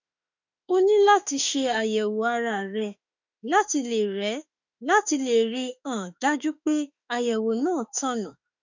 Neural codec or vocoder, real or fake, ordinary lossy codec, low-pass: autoencoder, 48 kHz, 32 numbers a frame, DAC-VAE, trained on Japanese speech; fake; none; 7.2 kHz